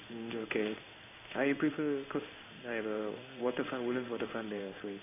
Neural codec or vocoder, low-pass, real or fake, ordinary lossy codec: codec, 16 kHz in and 24 kHz out, 1 kbps, XY-Tokenizer; 3.6 kHz; fake; AAC, 32 kbps